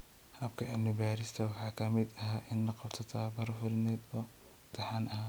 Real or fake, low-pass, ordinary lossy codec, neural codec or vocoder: real; none; none; none